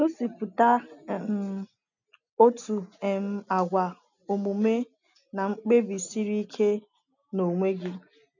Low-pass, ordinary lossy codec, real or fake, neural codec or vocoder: 7.2 kHz; none; real; none